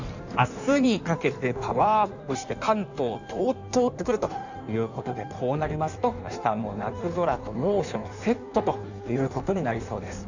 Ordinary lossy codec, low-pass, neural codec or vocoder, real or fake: none; 7.2 kHz; codec, 16 kHz in and 24 kHz out, 1.1 kbps, FireRedTTS-2 codec; fake